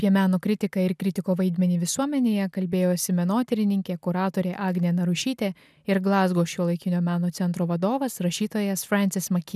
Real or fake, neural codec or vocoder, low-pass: real; none; 14.4 kHz